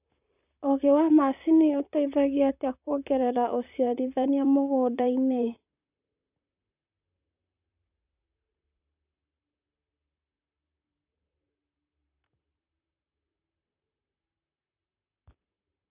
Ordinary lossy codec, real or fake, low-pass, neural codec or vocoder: none; fake; 3.6 kHz; vocoder, 44.1 kHz, 128 mel bands, Pupu-Vocoder